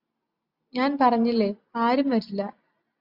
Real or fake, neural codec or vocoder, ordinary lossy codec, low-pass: real; none; Opus, 64 kbps; 5.4 kHz